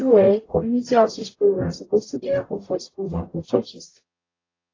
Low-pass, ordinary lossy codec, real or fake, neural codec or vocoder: 7.2 kHz; AAC, 32 kbps; fake; codec, 44.1 kHz, 0.9 kbps, DAC